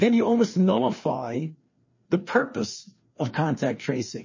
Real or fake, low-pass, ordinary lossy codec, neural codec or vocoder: fake; 7.2 kHz; MP3, 32 kbps; codec, 16 kHz, 2 kbps, FreqCodec, larger model